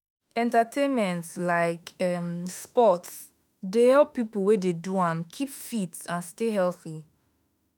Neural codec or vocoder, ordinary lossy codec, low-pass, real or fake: autoencoder, 48 kHz, 32 numbers a frame, DAC-VAE, trained on Japanese speech; none; none; fake